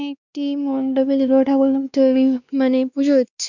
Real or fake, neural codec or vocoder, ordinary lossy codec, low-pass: fake; codec, 16 kHz, 2 kbps, X-Codec, WavLM features, trained on Multilingual LibriSpeech; none; 7.2 kHz